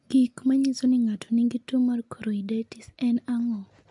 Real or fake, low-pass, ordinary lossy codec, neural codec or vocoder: real; 10.8 kHz; MP3, 64 kbps; none